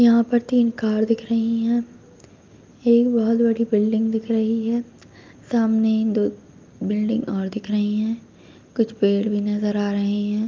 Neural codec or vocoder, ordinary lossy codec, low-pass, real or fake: none; Opus, 24 kbps; 7.2 kHz; real